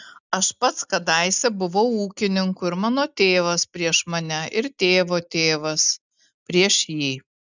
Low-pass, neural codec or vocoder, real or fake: 7.2 kHz; none; real